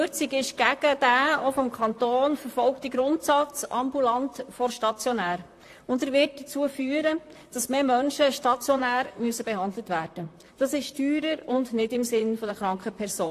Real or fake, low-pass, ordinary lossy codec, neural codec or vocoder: fake; 14.4 kHz; AAC, 64 kbps; vocoder, 44.1 kHz, 128 mel bands, Pupu-Vocoder